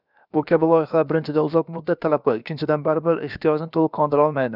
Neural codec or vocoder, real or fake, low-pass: codec, 16 kHz, about 1 kbps, DyCAST, with the encoder's durations; fake; 5.4 kHz